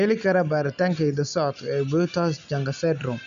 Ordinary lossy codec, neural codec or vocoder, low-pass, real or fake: none; none; 7.2 kHz; real